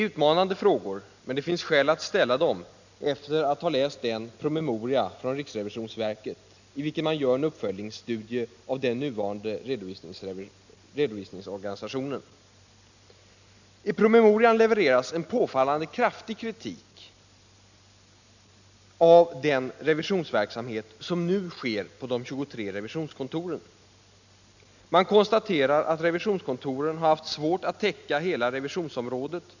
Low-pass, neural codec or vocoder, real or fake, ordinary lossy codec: 7.2 kHz; none; real; none